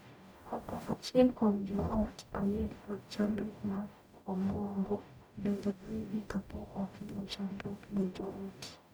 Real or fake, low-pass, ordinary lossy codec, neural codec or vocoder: fake; none; none; codec, 44.1 kHz, 0.9 kbps, DAC